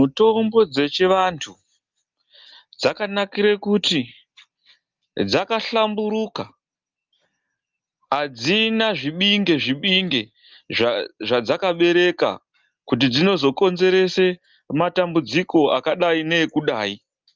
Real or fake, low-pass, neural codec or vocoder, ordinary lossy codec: real; 7.2 kHz; none; Opus, 24 kbps